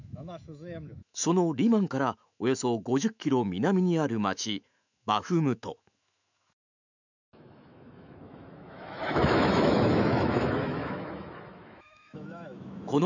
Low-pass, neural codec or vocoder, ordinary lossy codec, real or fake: 7.2 kHz; autoencoder, 48 kHz, 128 numbers a frame, DAC-VAE, trained on Japanese speech; none; fake